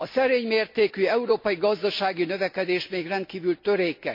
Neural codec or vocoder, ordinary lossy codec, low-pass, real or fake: none; MP3, 32 kbps; 5.4 kHz; real